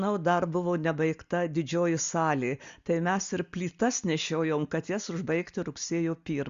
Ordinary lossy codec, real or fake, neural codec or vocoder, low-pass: Opus, 64 kbps; real; none; 7.2 kHz